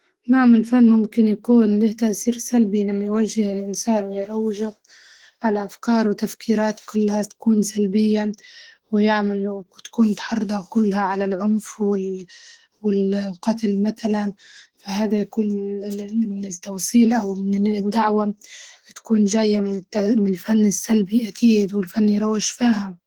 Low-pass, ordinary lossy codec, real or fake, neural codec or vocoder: 19.8 kHz; Opus, 16 kbps; fake; autoencoder, 48 kHz, 32 numbers a frame, DAC-VAE, trained on Japanese speech